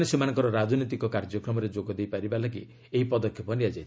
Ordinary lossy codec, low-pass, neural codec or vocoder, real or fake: none; none; none; real